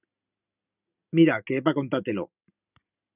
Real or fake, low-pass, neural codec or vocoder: real; 3.6 kHz; none